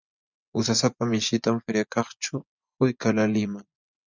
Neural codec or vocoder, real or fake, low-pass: vocoder, 24 kHz, 100 mel bands, Vocos; fake; 7.2 kHz